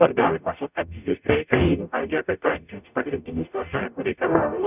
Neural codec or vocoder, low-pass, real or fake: codec, 44.1 kHz, 0.9 kbps, DAC; 3.6 kHz; fake